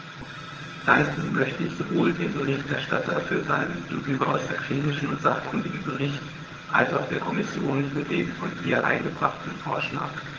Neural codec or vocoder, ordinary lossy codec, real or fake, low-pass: vocoder, 22.05 kHz, 80 mel bands, HiFi-GAN; Opus, 16 kbps; fake; 7.2 kHz